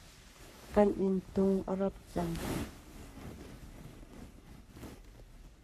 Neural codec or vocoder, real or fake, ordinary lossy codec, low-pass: codec, 44.1 kHz, 3.4 kbps, Pupu-Codec; fake; MP3, 64 kbps; 14.4 kHz